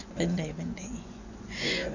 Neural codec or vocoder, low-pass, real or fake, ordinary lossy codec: vocoder, 24 kHz, 100 mel bands, Vocos; 7.2 kHz; fake; none